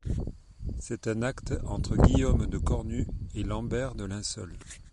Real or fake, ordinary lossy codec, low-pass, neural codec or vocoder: real; MP3, 48 kbps; 14.4 kHz; none